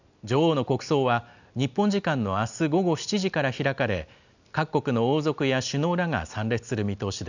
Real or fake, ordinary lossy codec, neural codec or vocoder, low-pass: real; none; none; 7.2 kHz